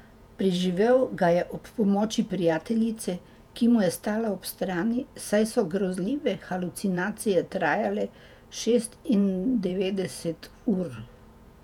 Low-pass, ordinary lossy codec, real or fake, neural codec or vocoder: 19.8 kHz; none; fake; vocoder, 48 kHz, 128 mel bands, Vocos